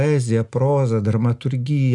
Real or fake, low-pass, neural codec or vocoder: real; 10.8 kHz; none